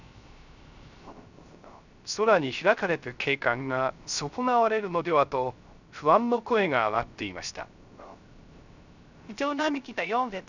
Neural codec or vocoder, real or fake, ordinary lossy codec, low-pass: codec, 16 kHz, 0.3 kbps, FocalCodec; fake; none; 7.2 kHz